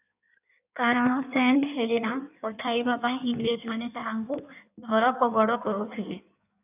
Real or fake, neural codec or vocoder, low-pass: fake; codec, 16 kHz in and 24 kHz out, 1.1 kbps, FireRedTTS-2 codec; 3.6 kHz